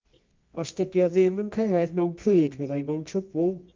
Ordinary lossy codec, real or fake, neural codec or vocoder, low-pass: Opus, 24 kbps; fake; codec, 24 kHz, 0.9 kbps, WavTokenizer, medium music audio release; 7.2 kHz